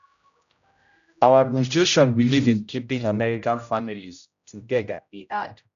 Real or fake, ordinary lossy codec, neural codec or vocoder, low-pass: fake; none; codec, 16 kHz, 0.5 kbps, X-Codec, HuBERT features, trained on general audio; 7.2 kHz